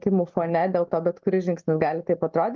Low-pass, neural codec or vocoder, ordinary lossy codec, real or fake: 7.2 kHz; none; Opus, 32 kbps; real